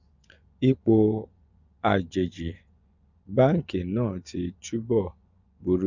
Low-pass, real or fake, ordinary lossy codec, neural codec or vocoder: 7.2 kHz; fake; none; vocoder, 24 kHz, 100 mel bands, Vocos